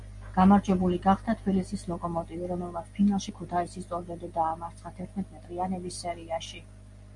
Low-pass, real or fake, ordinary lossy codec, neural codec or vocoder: 10.8 kHz; real; MP3, 64 kbps; none